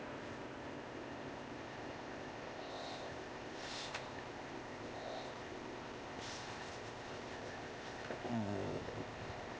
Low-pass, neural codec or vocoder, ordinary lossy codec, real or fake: none; codec, 16 kHz, 0.8 kbps, ZipCodec; none; fake